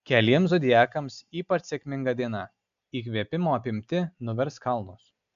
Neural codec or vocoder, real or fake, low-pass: none; real; 7.2 kHz